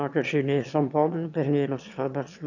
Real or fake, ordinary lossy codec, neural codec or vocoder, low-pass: fake; none; autoencoder, 22.05 kHz, a latent of 192 numbers a frame, VITS, trained on one speaker; 7.2 kHz